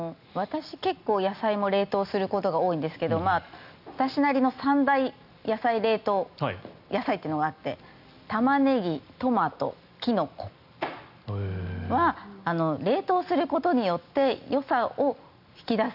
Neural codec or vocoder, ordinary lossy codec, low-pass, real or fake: none; none; 5.4 kHz; real